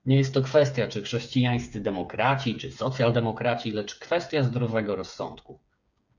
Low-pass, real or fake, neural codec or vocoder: 7.2 kHz; fake; codec, 16 kHz, 8 kbps, FreqCodec, smaller model